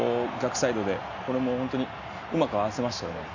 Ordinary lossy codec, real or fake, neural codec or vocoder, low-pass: none; real; none; 7.2 kHz